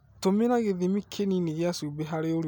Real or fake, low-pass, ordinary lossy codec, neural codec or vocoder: real; none; none; none